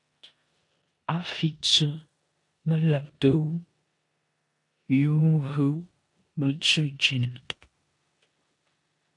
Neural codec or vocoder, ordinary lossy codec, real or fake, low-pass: codec, 16 kHz in and 24 kHz out, 0.9 kbps, LongCat-Audio-Codec, four codebook decoder; AAC, 48 kbps; fake; 10.8 kHz